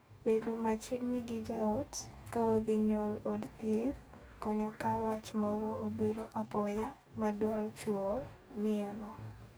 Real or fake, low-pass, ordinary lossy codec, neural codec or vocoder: fake; none; none; codec, 44.1 kHz, 2.6 kbps, DAC